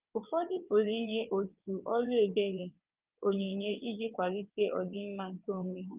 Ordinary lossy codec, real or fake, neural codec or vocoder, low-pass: Opus, 32 kbps; fake; vocoder, 22.05 kHz, 80 mel bands, WaveNeXt; 3.6 kHz